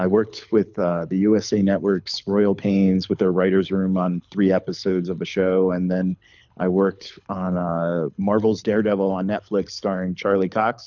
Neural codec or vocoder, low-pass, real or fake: codec, 24 kHz, 6 kbps, HILCodec; 7.2 kHz; fake